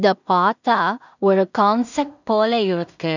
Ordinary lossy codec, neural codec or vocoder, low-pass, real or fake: none; codec, 16 kHz in and 24 kHz out, 0.4 kbps, LongCat-Audio-Codec, two codebook decoder; 7.2 kHz; fake